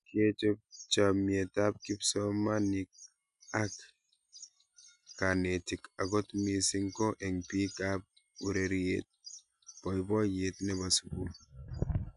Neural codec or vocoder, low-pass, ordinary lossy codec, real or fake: none; 10.8 kHz; none; real